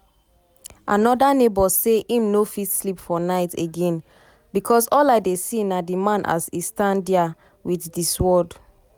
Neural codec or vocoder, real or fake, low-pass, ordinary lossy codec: none; real; none; none